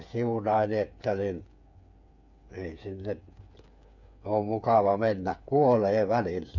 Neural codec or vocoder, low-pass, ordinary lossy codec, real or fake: codec, 16 kHz, 8 kbps, FreqCodec, smaller model; 7.2 kHz; none; fake